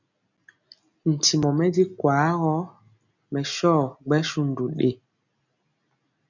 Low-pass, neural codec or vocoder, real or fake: 7.2 kHz; none; real